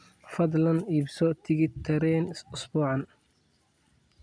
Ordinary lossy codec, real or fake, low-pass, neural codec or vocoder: none; real; 9.9 kHz; none